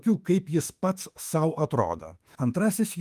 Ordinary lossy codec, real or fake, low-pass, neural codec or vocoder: Opus, 32 kbps; fake; 14.4 kHz; autoencoder, 48 kHz, 32 numbers a frame, DAC-VAE, trained on Japanese speech